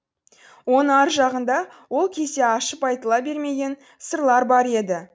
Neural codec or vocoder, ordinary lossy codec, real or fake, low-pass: none; none; real; none